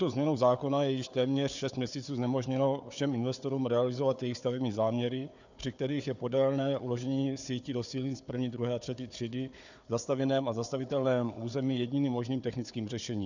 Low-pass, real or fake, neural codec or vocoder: 7.2 kHz; fake; codec, 16 kHz, 4 kbps, FunCodec, trained on Chinese and English, 50 frames a second